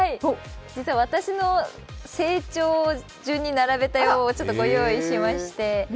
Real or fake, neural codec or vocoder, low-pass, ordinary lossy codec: real; none; none; none